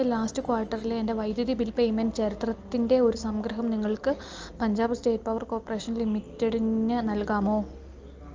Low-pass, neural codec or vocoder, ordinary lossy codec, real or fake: 7.2 kHz; none; Opus, 24 kbps; real